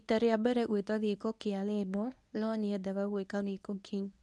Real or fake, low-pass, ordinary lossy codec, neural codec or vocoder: fake; none; none; codec, 24 kHz, 0.9 kbps, WavTokenizer, medium speech release version 1